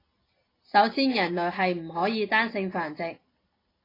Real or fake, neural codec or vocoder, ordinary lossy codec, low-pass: real; none; AAC, 24 kbps; 5.4 kHz